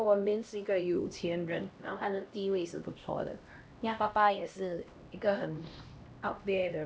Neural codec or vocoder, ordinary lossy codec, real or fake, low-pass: codec, 16 kHz, 1 kbps, X-Codec, HuBERT features, trained on LibriSpeech; none; fake; none